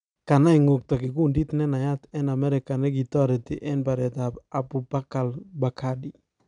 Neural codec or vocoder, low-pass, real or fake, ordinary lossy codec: none; 9.9 kHz; real; none